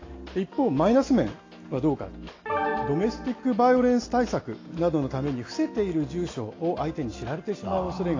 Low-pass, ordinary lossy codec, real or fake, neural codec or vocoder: 7.2 kHz; AAC, 32 kbps; real; none